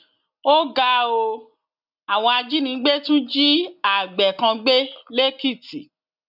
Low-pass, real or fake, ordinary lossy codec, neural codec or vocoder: 5.4 kHz; real; none; none